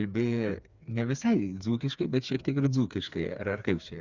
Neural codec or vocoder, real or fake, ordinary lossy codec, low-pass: codec, 16 kHz, 4 kbps, FreqCodec, smaller model; fake; Opus, 64 kbps; 7.2 kHz